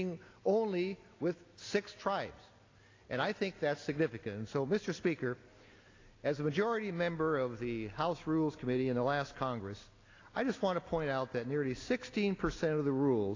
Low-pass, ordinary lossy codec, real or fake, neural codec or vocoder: 7.2 kHz; AAC, 32 kbps; real; none